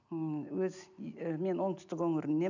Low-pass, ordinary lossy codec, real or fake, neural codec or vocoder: 7.2 kHz; none; real; none